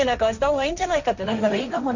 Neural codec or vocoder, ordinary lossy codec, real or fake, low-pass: codec, 16 kHz, 1.1 kbps, Voila-Tokenizer; none; fake; 7.2 kHz